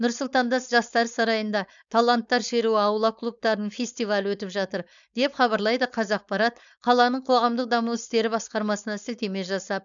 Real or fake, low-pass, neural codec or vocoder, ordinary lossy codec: fake; 7.2 kHz; codec, 16 kHz, 4.8 kbps, FACodec; none